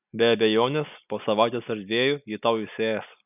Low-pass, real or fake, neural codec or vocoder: 3.6 kHz; real; none